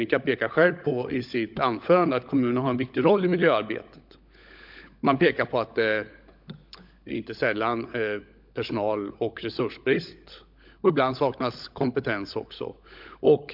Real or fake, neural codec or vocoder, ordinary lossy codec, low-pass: fake; codec, 16 kHz, 16 kbps, FunCodec, trained on LibriTTS, 50 frames a second; MP3, 48 kbps; 5.4 kHz